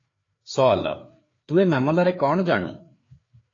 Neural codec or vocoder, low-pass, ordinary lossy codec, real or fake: codec, 16 kHz, 4 kbps, FreqCodec, larger model; 7.2 kHz; AAC, 32 kbps; fake